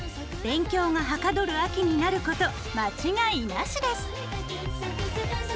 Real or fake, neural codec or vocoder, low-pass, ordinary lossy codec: real; none; none; none